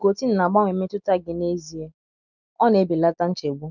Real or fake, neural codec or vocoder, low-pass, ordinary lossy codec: real; none; 7.2 kHz; none